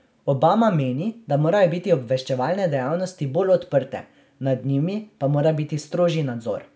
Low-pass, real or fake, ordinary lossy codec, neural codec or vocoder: none; real; none; none